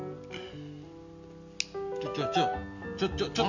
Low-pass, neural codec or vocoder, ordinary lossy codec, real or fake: 7.2 kHz; none; none; real